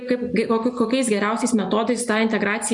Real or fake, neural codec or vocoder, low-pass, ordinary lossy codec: real; none; 10.8 kHz; MP3, 64 kbps